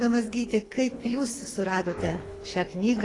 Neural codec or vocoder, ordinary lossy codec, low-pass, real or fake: codec, 24 kHz, 3 kbps, HILCodec; AAC, 32 kbps; 10.8 kHz; fake